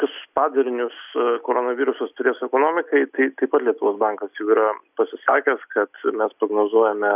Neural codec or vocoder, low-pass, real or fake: none; 3.6 kHz; real